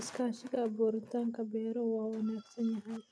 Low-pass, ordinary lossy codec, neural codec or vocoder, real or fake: none; none; none; real